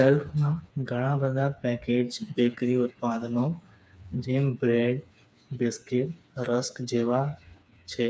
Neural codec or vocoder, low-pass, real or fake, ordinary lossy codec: codec, 16 kHz, 4 kbps, FreqCodec, smaller model; none; fake; none